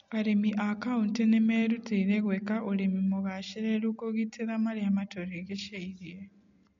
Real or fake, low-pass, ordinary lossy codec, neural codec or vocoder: real; 7.2 kHz; MP3, 48 kbps; none